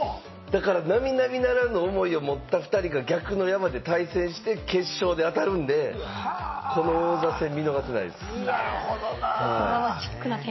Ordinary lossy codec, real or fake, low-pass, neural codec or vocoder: MP3, 24 kbps; real; 7.2 kHz; none